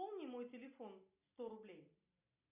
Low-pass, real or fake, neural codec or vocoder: 3.6 kHz; real; none